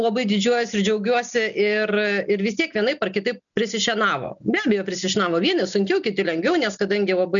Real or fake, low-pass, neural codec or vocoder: real; 7.2 kHz; none